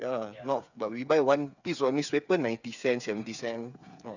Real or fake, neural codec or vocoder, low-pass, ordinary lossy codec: fake; codec, 16 kHz, 8 kbps, FreqCodec, smaller model; 7.2 kHz; none